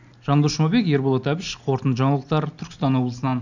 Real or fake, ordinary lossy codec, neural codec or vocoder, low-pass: real; none; none; 7.2 kHz